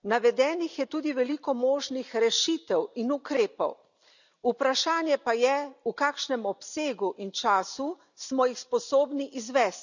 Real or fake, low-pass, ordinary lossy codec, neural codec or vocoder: real; 7.2 kHz; none; none